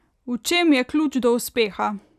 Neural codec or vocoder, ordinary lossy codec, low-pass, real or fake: none; none; 14.4 kHz; real